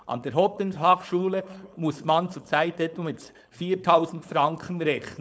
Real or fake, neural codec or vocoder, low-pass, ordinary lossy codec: fake; codec, 16 kHz, 4.8 kbps, FACodec; none; none